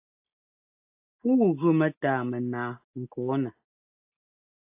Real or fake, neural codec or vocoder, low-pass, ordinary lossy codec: real; none; 3.6 kHz; AAC, 32 kbps